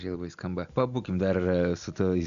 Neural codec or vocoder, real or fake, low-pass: none; real; 7.2 kHz